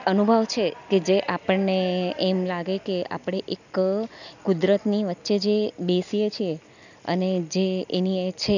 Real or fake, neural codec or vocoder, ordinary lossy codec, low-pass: real; none; none; 7.2 kHz